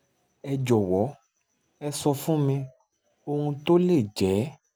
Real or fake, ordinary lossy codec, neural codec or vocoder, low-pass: real; none; none; none